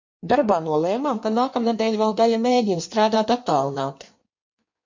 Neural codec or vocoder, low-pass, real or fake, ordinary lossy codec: codec, 16 kHz in and 24 kHz out, 1.1 kbps, FireRedTTS-2 codec; 7.2 kHz; fake; MP3, 48 kbps